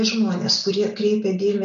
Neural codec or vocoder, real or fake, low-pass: none; real; 7.2 kHz